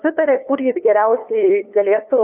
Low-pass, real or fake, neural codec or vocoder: 3.6 kHz; fake; codec, 16 kHz, 2 kbps, FunCodec, trained on LibriTTS, 25 frames a second